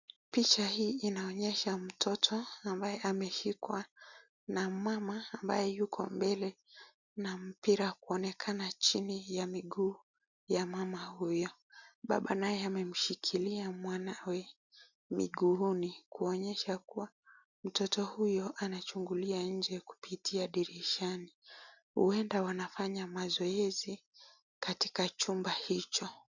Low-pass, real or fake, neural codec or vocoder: 7.2 kHz; real; none